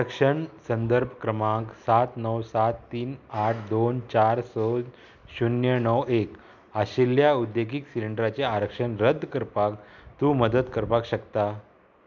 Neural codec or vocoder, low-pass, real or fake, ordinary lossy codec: none; 7.2 kHz; real; none